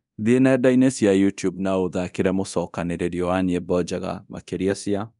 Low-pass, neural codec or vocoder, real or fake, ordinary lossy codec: 10.8 kHz; codec, 24 kHz, 0.9 kbps, DualCodec; fake; none